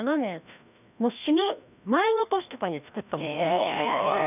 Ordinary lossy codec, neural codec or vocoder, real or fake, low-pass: none; codec, 16 kHz, 1 kbps, FreqCodec, larger model; fake; 3.6 kHz